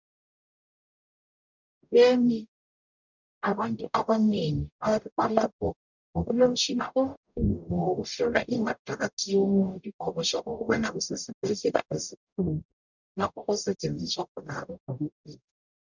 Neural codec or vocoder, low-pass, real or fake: codec, 44.1 kHz, 0.9 kbps, DAC; 7.2 kHz; fake